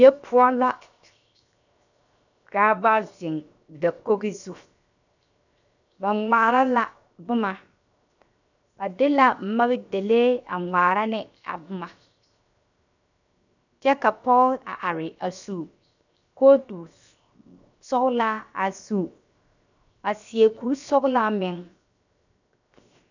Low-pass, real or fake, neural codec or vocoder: 7.2 kHz; fake; codec, 16 kHz, 0.7 kbps, FocalCodec